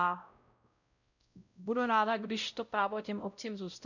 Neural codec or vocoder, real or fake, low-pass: codec, 16 kHz, 0.5 kbps, X-Codec, WavLM features, trained on Multilingual LibriSpeech; fake; 7.2 kHz